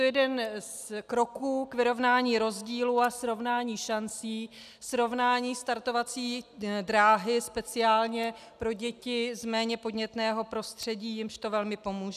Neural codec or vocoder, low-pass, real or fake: none; 14.4 kHz; real